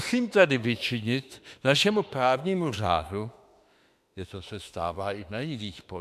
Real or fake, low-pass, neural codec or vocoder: fake; 14.4 kHz; autoencoder, 48 kHz, 32 numbers a frame, DAC-VAE, trained on Japanese speech